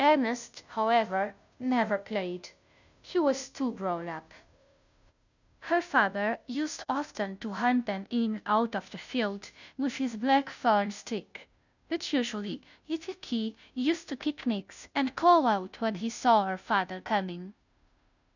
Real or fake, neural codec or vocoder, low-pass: fake; codec, 16 kHz, 0.5 kbps, FunCodec, trained on Chinese and English, 25 frames a second; 7.2 kHz